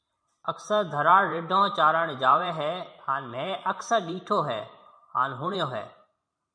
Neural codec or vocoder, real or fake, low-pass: vocoder, 44.1 kHz, 128 mel bands every 512 samples, BigVGAN v2; fake; 9.9 kHz